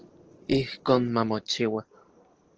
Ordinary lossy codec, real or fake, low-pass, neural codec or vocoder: Opus, 16 kbps; real; 7.2 kHz; none